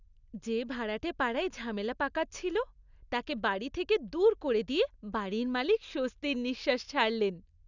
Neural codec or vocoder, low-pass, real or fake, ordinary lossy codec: none; 7.2 kHz; real; none